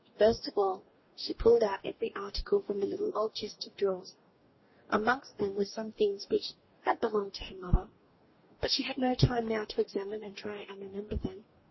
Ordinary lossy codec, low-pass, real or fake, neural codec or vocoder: MP3, 24 kbps; 7.2 kHz; fake; codec, 44.1 kHz, 2.6 kbps, DAC